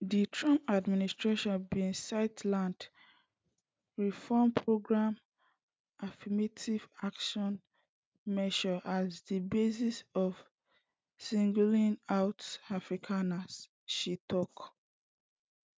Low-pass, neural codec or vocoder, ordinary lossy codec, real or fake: none; none; none; real